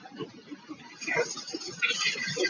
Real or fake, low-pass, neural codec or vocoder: real; 7.2 kHz; none